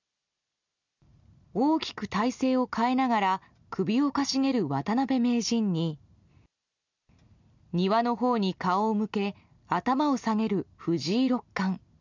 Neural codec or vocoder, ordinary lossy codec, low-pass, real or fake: none; none; 7.2 kHz; real